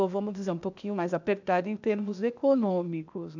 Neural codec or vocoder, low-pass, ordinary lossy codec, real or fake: codec, 16 kHz, 0.8 kbps, ZipCodec; 7.2 kHz; none; fake